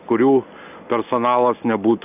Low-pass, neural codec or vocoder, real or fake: 3.6 kHz; none; real